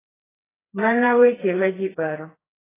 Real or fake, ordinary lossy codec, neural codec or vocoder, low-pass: fake; AAC, 16 kbps; codec, 16 kHz, 2 kbps, FreqCodec, smaller model; 3.6 kHz